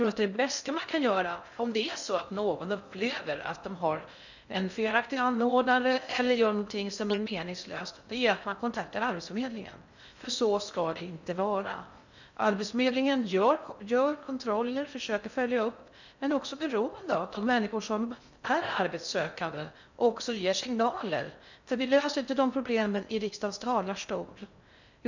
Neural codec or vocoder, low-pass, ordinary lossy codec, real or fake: codec, 16 kHz in and 24 kHz out, 0.6 kbps, FocalCodec, streaming, 2048 codes; 7.2 kHz; none; fake